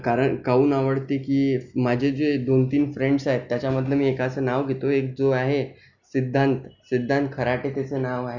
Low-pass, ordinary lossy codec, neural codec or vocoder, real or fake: 7.2 kHz; none; none; real